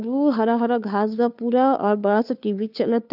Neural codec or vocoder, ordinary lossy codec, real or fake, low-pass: codec, 16 kHz, 2 kbps, FunCodec, trained on Chinese and English, 25 frames a second; none; fake; 5.4 kHz